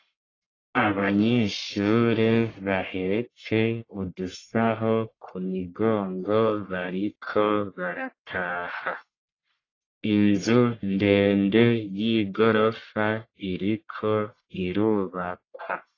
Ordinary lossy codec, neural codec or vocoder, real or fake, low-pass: AAC, 32 kbps; codec, 44.1 kHz, 1.7 kbps, Pupu-Codec; fake; 7.2 kHz